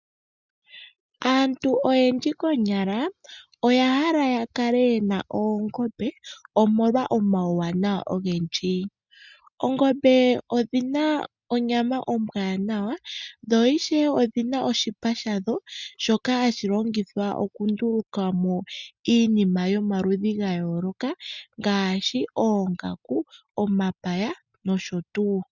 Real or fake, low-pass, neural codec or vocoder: real; 7.2 kHz; none